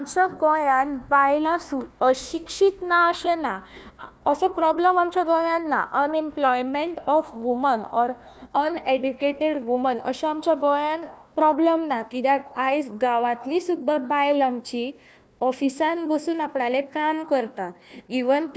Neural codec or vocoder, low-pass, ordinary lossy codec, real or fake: codec, 16 kHz, 1 kbps, FunCodec, trained on Chinese and English, 50 frames a second; none; none; fake